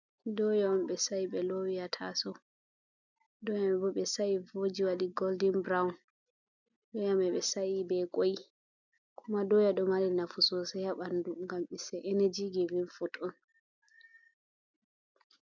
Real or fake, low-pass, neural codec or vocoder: real; 7.2 kHz; none